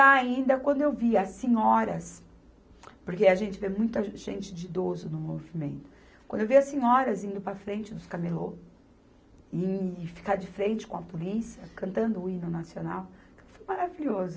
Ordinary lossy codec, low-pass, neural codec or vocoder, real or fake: none; none; none; real